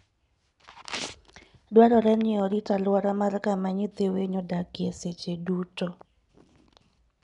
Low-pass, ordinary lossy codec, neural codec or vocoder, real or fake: 10.8 kHz; none; none; real